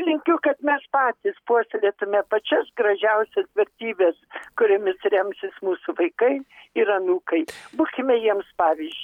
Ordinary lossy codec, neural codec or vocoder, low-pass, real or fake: MP3, 96 kbps; none; 19.8 kHz; real